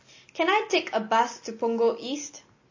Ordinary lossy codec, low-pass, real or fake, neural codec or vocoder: MP3, 32 kbps; 7.2 kHz; real; none